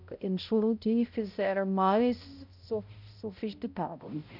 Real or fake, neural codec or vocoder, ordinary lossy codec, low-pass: fake; codec, 16 kHz, 0.5 kbps, X-Codec, HuBERT features, trained on balanced general audio; none; 5.4 kHz